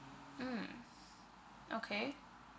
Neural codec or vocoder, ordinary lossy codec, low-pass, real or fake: none; none; none; real